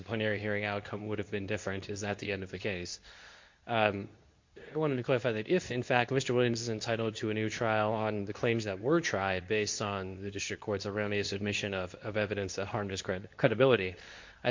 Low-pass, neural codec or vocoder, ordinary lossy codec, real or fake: 7.2 kHz; codec, 24 kHz, 0.9 kbps, WavTokenizer, medium speech release version 2; MP3, 48 kbps; fake